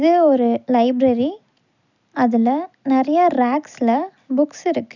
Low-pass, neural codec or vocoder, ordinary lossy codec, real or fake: 7.2 kHz; none; none; real